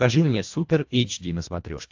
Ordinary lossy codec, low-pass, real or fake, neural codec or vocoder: AAC, 48 kbps; 7.2 kHz; fake; codec, 24 kHz, 1.5 kbps, HILCodec